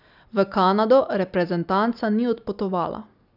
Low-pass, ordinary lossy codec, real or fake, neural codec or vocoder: 5.4 kHz; none; real; none